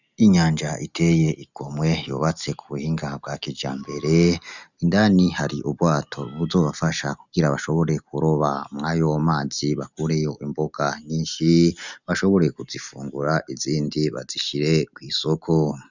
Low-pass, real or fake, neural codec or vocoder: 7.2 kHz; real; none